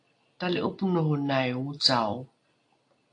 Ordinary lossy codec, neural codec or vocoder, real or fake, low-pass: AAC, 48 kbps; none; real; 9.9 kHz